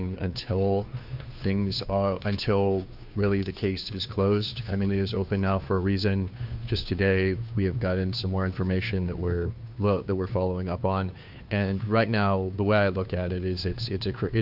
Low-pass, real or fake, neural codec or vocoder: 5.4 kHz; fake; codec, 16 kHz, 2 kbps, FunCodec, trained on Chinese and English, 25 frames a second